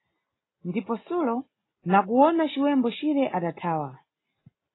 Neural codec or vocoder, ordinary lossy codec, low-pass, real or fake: none; AAC, 16 kbps; 7.2 kHz; real